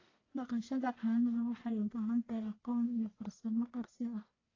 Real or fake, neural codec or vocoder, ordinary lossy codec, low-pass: fake; codec, 44.1 kHz, 1.7 kbps, Pupu-Codec; AAC, 32 kbps; 7.2 kHz